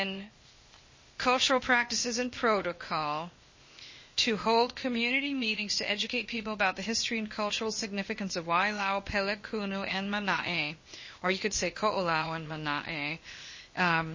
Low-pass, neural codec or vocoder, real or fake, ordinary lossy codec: 7.2 kHz; codec, 16 kHz, 0.8 kbps, ZipCodec; fake; MP3, 32 kbps